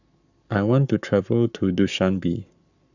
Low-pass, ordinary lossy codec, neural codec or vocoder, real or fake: 7.2 kHz; none; codec, 44.1 kHz, 7.8 kbps, Pupu-Codec; fake